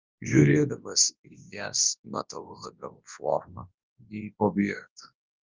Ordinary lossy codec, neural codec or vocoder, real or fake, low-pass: Opus, 24 kbps; codec, 24 kHz, 0.9 kbps, WavTokenizer, large speech release; fake; 7.2 kHz